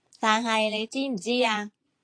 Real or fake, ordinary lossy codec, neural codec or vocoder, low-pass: fake; AAC, 48 kbps; vocoder, 22.05 kHz, 80 mel bands, Vocos; 9.9 kHz